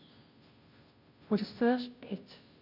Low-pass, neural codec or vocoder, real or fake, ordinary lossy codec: 5.4 kHz; codec, 16 kHz, 0.5 kbps, FunCodec, trained on Chinese and English, 25 frames a second; fake; none